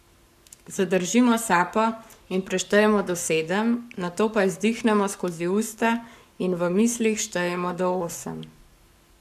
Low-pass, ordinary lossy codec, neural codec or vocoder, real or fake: 14.4 kHz; none; codec, 44.1 kHz, 7.8 kbps, Pupu-Codec; fake